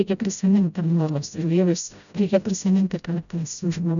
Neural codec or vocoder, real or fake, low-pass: codec, 16 kHz, 0.5 kbps, FreqCodec, smaller model; fake; 7.2 kHz